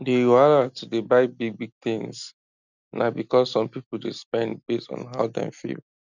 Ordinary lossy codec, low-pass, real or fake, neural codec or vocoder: none; 7.2 kHz; real; none